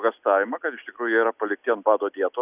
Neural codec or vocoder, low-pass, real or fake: none; 3.6 kHz; real